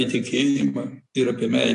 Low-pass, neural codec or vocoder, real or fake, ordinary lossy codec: 10.8 kHz; none; real; AAC, 48 kbps